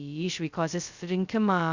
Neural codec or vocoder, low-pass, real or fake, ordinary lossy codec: codec, 16 kHz, 0.2 kbps, FocalCodec; 7.2 kHz; fake; Opus, 64 kbps